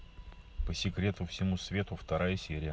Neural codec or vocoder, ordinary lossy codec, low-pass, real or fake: none; none; none; real